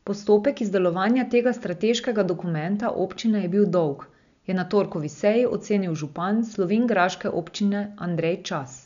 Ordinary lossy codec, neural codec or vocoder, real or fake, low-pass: none; none; real; 7.2 kHz